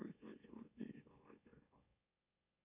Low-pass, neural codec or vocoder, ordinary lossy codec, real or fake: 3.6 kHz; autoencoder, 44.1 kHz, a latent of 192 numbers a frame, MeloTTS; MP3, 32 kbps; fake